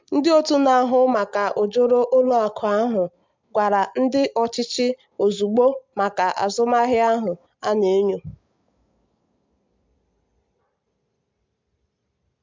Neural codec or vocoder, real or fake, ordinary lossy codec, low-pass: none; real; MP3, 64 kbps; 7.2 kHz